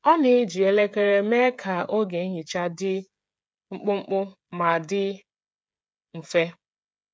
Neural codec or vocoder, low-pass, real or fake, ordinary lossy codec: codec, 16 kHz, 16 kbps, FreqCodec, smaller model; none; fake; none